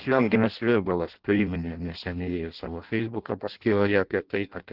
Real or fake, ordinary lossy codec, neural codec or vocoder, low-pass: fake; Opus, 16 kbps; codec, 16 kHz in and 24 kHz out, 0.6 kbps, FireRedTTS-2 codec; 5.4 kHz